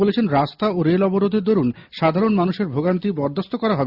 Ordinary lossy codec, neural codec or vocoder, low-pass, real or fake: Opus, 64 kbps; none; 5.4 kHz; real